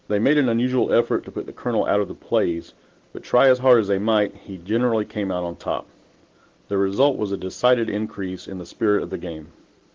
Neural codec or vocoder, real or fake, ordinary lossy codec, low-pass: none; real; Opus, 16 kbps; 7.2 kHz